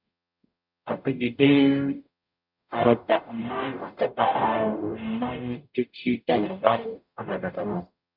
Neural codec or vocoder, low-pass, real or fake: codec, 44.1 kHz, 0.9 kbps, DAC; 5.4 kHz; fake